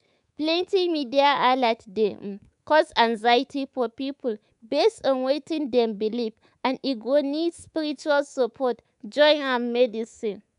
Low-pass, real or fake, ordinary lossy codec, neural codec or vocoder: 10.8 kHz; fake; none; codec, 24 kHz, 3.1 kbps, DualCodec